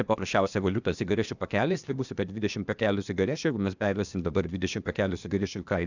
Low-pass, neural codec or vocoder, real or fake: 7.2 kHz; codec, 16 kHz, 0.8 kbps, ZipCodec; fake